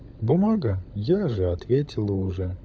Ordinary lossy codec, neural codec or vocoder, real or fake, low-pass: none; codec, 16 kHz, 16 kbps, FunCodec, trained on LibriTTS, 50 frames a second; fake; none